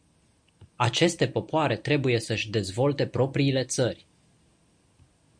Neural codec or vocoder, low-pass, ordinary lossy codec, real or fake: none; 9.9 kHz; Opus, 64 kbps; real